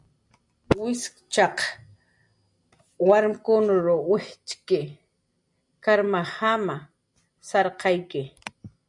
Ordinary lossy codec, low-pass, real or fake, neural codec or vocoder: MP3, 64 kbps; 10.8 kHz; real; none